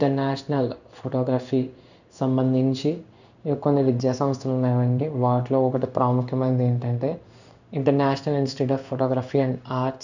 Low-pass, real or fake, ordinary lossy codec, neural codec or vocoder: 7.2 kHz; fake; none; codec, 16 kHz in and 24 kHz out, 1 kbps, XY-Tokenizer